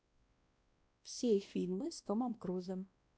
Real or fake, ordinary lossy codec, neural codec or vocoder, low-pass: fake; none; codec, 16 kHz, 1 kbps, X-Codec, WavLM features, trained on Multilingual LibriSpeech; none